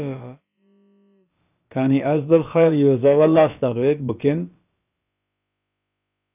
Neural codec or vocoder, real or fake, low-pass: codec, 16 kHz, about 1 kbps, DyCAST, with the encoder's durations; fake; 3.6 kHz